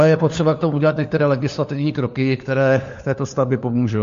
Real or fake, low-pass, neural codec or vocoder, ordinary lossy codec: fake; 7.2 kHz; codec, 16 kHz, 4 kbps, FunCodec, trained on LibriTTS, 50 frames a second; MP3, 48 kbps